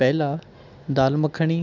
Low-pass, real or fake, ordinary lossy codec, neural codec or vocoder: 7.2 kHz; real; none; none